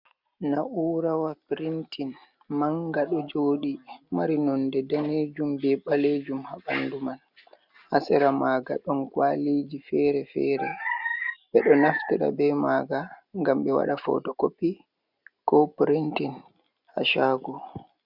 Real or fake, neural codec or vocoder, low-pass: real; none; 5.4 kHz